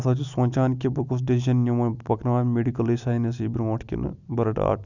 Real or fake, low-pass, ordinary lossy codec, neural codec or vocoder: real; 7.2 kHz; none; none